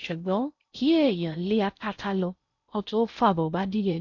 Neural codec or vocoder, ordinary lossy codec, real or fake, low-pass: codec, 16 kHz in and 24 kHz out, 0.6 kbps, FocalCodec, streaming, 4096 codes; none; fake; 7.2 kHz